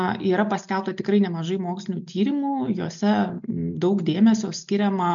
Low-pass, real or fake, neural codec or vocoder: 7.2 kHz; real; none